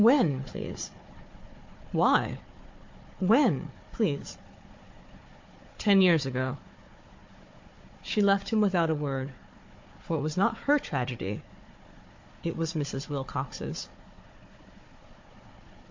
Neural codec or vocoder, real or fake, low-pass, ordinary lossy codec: codec, 16 kHz, 4 kbps, FunCodec, trained on Chinese and English, 50 frames a second; fake; 7.2 kHz; MP3, 48 kbps